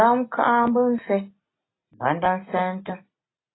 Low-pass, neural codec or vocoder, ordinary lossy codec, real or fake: 7.2 kHz; none; AAC, 16 kbps; real